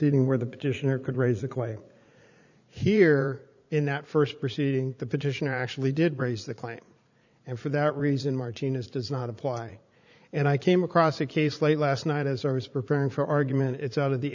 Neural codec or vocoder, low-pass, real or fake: vocoder, 44.1 kHz, 80 mel bands, Vocos; 7.2 kHz; fake